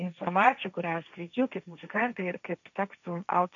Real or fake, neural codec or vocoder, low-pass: fake; codec, 16 kHz, 1.1 kbps, Voila-Tokenizer; 7.2 kHz